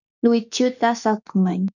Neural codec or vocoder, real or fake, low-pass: autoencoder, 48 kHz, 32 numbers a frame, DAC-VAE, trained on Japanese speech; fake; 7.2 kHz